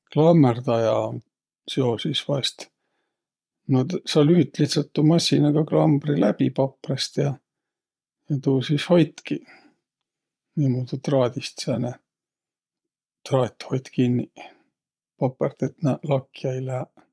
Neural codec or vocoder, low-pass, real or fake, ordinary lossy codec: vocoder, 22.05 kHz, 80 mel bands, Vocos; none; fake; none